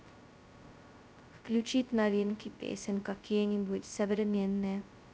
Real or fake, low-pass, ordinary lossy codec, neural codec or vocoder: fake; none; none; codec, 16 kHz, 0.2 kbps, FocalCodec